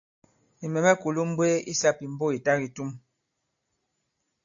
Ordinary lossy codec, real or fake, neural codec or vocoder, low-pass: AAC, 64 kbps; real; none; 7.2 kHz